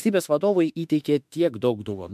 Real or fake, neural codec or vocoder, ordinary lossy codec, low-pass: fake; autoencoder, 48 kHz, 32 numbers a frame, DAC-VAE, trained on Japanese speech; MP3, 96 kbps; 14.4 kHz